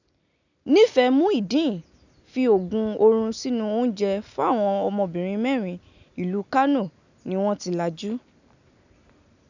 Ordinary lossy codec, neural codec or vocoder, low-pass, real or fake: none; none; 7.2 kHz; real